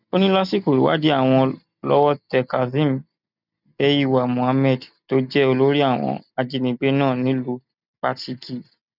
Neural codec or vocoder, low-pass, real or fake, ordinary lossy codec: none; 5.4 kHz; real; none